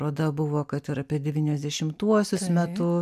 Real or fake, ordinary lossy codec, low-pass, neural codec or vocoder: real; MP3, 96 kbps; 14.4 kHz; none